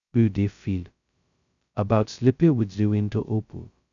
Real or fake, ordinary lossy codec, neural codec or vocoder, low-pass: fake; none; codec, 16 kHz, 0.2 kbps, FocalCodec; 7.2 kHz